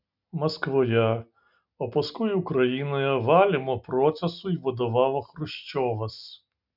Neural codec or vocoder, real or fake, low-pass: none; real; 5.4 kHz